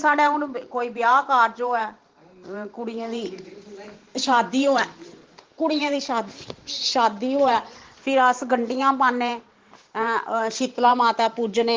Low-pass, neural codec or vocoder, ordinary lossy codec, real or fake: 7.2 kHz; vocoder, 44.1 kHz, 128 mel bands every 512 samples, BigVGAN v2; Opus, 16 kbps; fake